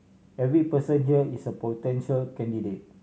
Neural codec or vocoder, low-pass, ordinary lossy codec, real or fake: none; none; none; real